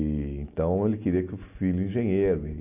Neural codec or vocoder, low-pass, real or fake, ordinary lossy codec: none; 3.6 kHz; real; AAC, 32 kbps